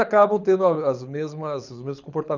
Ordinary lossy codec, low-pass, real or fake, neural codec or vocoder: none; 7.2 kHz; fake; codec, 24 kHz, 6 kbps, HILCodec